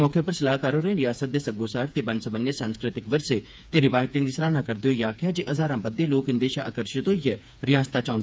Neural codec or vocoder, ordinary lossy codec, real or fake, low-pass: codec, 16 kHz, 4 kbps, FreqCodec, smaller model; none; fake; none